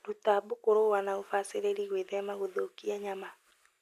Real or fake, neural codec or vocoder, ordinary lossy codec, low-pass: real; none; MP3, 96 kbps; 14.4 kHz